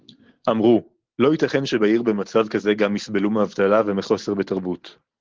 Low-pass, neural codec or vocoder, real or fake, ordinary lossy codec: 7.2 kHz; none; real; Opus, 16 kbps